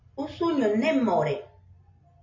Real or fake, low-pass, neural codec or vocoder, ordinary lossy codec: real; 7.2 kHz; none; MP3, 32 kbps